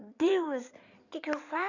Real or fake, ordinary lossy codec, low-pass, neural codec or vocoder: fake; none; 7.2 kHz; codec, 16 kHz in and 24 kHz out, 2.2 kbps, FireRedTTS-2 codec